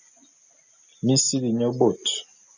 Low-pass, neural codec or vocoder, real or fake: 7.2 kHz; none; real